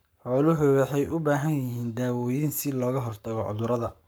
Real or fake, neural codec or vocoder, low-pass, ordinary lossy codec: fake; codec, 44.1 kHz, 7.8 kbps, Pupu-Codec; none; none